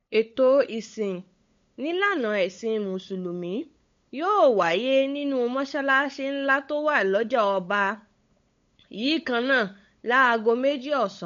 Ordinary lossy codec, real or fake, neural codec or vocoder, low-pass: MP3, 48 kbps; fake; codec, 16 kHz, 8 kbps, FunCodec, trained on LibriTTS, 25 frames a second; 7.2 kHz